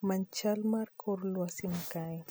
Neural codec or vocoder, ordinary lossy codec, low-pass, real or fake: none; none; none; real